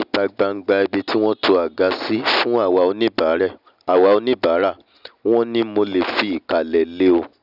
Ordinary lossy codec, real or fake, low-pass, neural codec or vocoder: none; real; 5.4 kHz; none